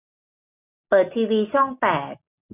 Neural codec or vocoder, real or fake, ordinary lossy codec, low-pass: none; real; none; 3.6 kHz